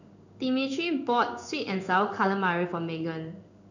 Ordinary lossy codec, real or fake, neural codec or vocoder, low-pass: none; fake; codec, 16 kHz in and 24 kHz out, 1 kbps, XY-Tokenizer; 7.2 kHz